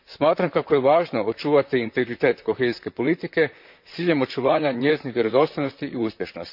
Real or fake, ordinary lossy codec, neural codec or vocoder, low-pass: fake; MP3, 48 kbps; vocoder, 44.1 kHz, 128 mel bands, Pupu-Vocoder; 5.4 kHz